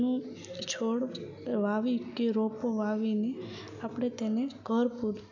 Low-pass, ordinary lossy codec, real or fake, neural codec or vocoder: 7.2 kHz; none; real; none